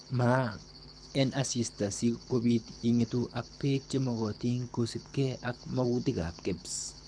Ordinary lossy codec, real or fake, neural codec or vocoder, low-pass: none; fake; codec, 24 kHz, 6 kbps, HILCodec; 9.9 kHz